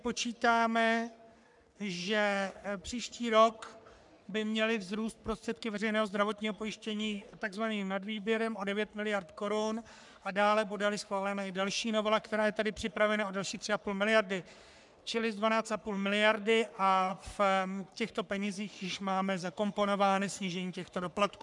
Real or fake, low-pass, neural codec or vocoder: fake; 10.8 kHz; codec, 44.1 kHz, 3.4 kbps, Pupu-Codec